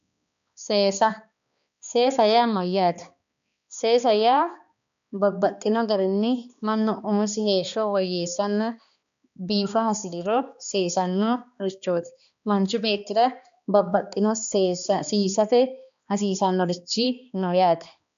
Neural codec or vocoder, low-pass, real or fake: codec, 16 kHz, 2 kbps, X-Codec, HuBERT features, trained on balanced general audio; 7.2 kHz; fake